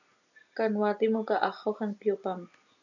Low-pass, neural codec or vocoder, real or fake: 7.2 kHz; none; real